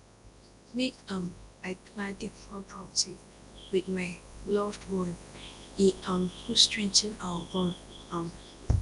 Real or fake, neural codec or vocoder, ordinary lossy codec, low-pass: fake; codec, 24 kHz, 0.9 kbps, WavTokenizer, large speech release; none; 10.8 kHz